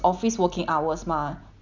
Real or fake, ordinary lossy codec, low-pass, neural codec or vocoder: real; none; 7.2 kHz; none